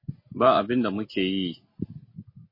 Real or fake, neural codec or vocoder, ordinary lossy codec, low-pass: real; none; MP3, 24 kbps; 5.4 kHz